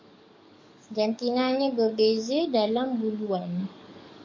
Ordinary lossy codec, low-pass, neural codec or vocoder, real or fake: MP3, 48 kbps; 7.2 kHz; codec, 44.1 kHz, 7.8 kbps, DAC; fake